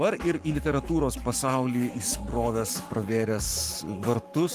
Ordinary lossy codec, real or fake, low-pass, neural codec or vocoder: Opus, 32 kbps; fake; 14.4 kHz; codec, 44.1 kHz, 7.8 kbps, Pupu-Codec